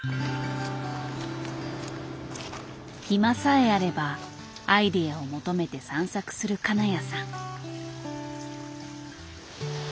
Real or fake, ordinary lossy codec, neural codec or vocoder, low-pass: real; none; none; none